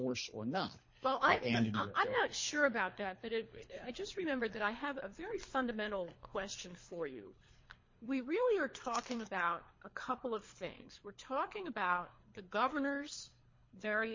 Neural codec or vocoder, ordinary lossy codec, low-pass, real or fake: codec, 24 kHz, 3 kbps, HILCodec; MP3, 32 kbps; 7.2 kHz; fake